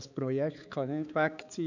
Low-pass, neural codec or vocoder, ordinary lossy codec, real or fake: 7.2 kHz; codec, 16 kHz, 4 kbps, X-Codec, HuBERT features, trained on balanced general audio; none; fake